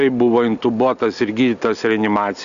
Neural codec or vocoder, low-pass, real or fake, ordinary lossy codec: none; 7.2 kHz; real; Opus, 64 kbps